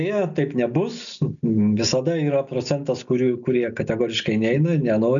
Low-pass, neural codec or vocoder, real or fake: 7.2 kHz; none; real